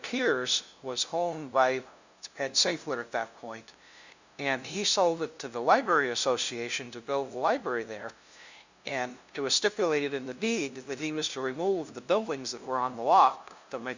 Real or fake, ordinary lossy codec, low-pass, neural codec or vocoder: fake; Opus, 64 kbps; 7.2 kHz; codec, 16 kHz, 0.5 kbps, FunCodec, trained on LibriTTS, 25 frames a second